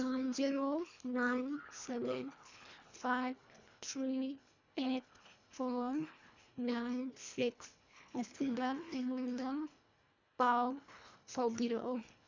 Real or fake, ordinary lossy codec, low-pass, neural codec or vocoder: fake; MP3, 64 kbps; 7.2 kHz; codec, 24 kHz, 1.5 kbps, HILCodec